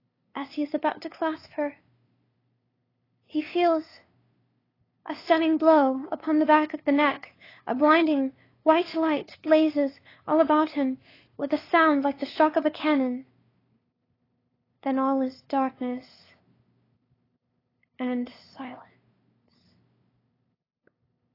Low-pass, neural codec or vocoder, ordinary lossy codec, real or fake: 5.4 kHz; codec, 16 kHz, 2 kbps, FunCodec, trained on LibriTTS, 25 frames a second; AAC, 24 kbps; fake